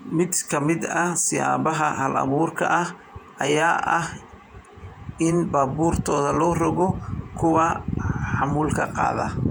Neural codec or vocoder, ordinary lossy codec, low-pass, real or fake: vocoder, 48 kHz, 128 mel bands, Vocos; none; 19.8 kHz; fake